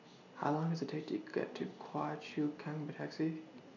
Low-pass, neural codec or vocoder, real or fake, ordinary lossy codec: 7.2 kHz; none; real; none